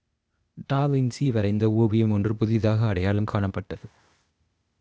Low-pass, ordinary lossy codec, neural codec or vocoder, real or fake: none; none; codec, 16 kHz, 0.8 kbps, ZipCodec; fake